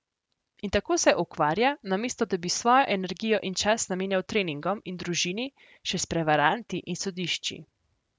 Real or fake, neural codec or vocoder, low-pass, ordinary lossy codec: real; none; none; none